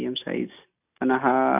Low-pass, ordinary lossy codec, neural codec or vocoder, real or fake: 3.6 kHz; none; none; real